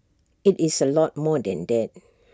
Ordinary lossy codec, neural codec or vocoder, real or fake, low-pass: none; none; real; none